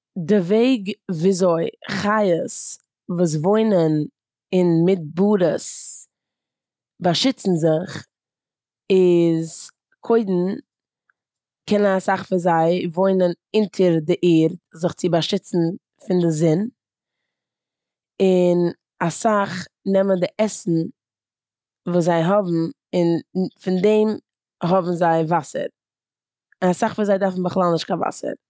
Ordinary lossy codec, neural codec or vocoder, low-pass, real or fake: none; none; none; real